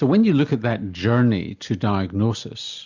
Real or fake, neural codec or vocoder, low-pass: real; none; 7.2 kHz